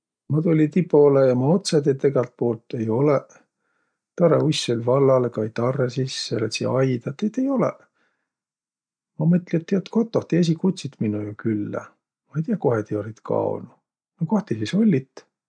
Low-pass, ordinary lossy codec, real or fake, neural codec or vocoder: 9.9 kHz; none; real; none